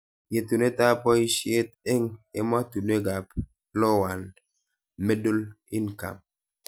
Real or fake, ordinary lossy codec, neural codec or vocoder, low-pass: real; none; none; none